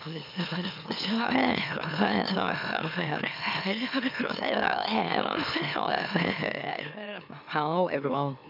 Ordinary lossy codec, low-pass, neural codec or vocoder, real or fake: none; 5.4 kHz; autoencoder, 44.1 kHz, a latent of 192 numbers a frame, MeloTTS; fake